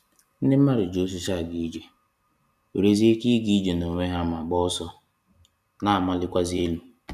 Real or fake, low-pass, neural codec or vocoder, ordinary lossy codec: fake; 14.4 kHz; vocoder, 44.1 kHz, 128 mel bands every 256 samples, BigVGAN v2; none